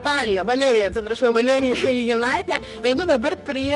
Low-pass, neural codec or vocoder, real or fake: 10.8 kHz; codec, 24 kHz, 0.9 kbps, WavTokenizer, medium music audio release; fake